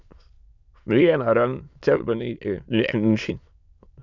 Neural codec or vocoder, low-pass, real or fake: autoencoder, 22.05 kHz, a latent of 192 numbers a frame, VITS, trained on many speakers; 7.2 kHz; fake